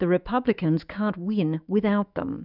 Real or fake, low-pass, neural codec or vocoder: fake; 5.4 kHz; autoencoder, 48 kHz, 128 numbers a frame, DAC-VAE, trained on Japanese speech